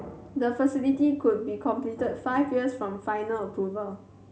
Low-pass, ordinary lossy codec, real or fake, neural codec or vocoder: none; none; real; none